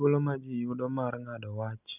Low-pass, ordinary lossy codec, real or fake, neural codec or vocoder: 3.6 kHz; none; real; none